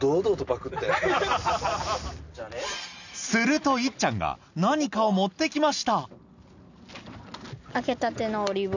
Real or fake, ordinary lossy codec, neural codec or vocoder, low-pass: real; none; none; 7.2 kHz